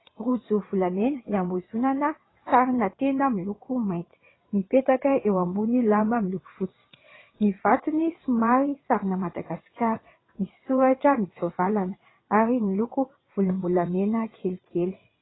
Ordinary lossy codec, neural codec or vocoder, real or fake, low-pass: AAC, 16 kbps; vocoder, 22.05 kHz, 80 mel bands, WaveNeXt; fake; 7.2 kHz